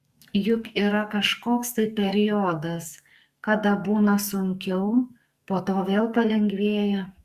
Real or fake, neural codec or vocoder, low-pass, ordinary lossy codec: fake; codec, 44.1 kHz, 2.6 kbps, SNAC; 14.4 kHz; Opus, 64 kbps